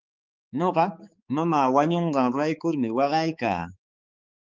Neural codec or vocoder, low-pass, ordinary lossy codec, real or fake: codec, 16 kHz, 4 kbps, X-Codec, HuBERT features, trained on balanced general audio; 7.2 kHz; Opus, 32 kbps; fake